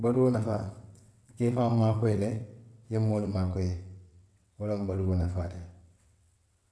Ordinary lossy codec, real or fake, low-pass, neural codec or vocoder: none; fake; none; vocoder, 22.05 kHz, 80 mel bands, WaveNeXt